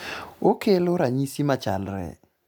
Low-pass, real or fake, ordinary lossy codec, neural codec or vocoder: none; real; none; none